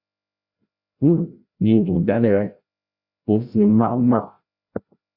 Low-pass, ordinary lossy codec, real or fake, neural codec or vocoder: 5.4 kHz; Opus, 64 kbps; fake; codec, 16 kHz, 0.5 kbps, FreqCodec, larger model